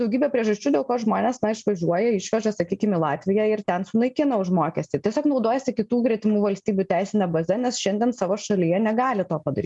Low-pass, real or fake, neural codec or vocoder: 10.8 kHz; real; none